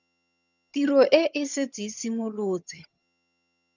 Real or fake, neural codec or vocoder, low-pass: fake; vocoder, 22.05 kHz, 80 mel bands, HiFi-GAN; 7.2 kHz